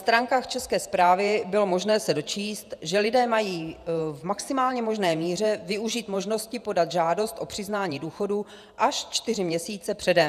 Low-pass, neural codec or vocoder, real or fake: 14.4 kHz; vocoder, 48 kHz, 128 mel bands, Vocos; fake